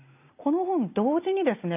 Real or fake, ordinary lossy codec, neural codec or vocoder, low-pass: real; none; none; 3.6 kHz